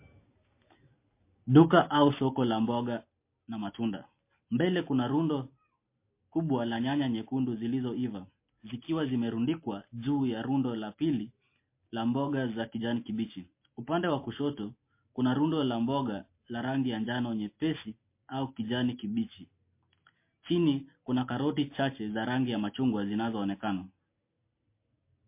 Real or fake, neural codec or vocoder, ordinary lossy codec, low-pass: real; none; MP3, 24 kbps; 3.6 kHz